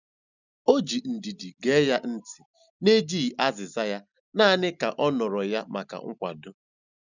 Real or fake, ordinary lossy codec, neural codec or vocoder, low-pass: real; none; none; 7.2 kHz